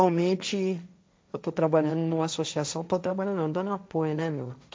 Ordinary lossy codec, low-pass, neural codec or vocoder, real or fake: none; none; codec, 16 kHz, 1.1 kbps, Voila-Tokenizer; fake